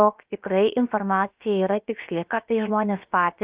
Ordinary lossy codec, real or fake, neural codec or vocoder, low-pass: Opus, 24 kbps; fake; codec, 16 kHz, about 1 kbps, DyCAST, with the encoder's durations; 3.6 kHz